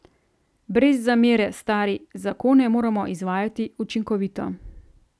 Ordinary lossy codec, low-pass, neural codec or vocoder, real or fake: none; none; none; real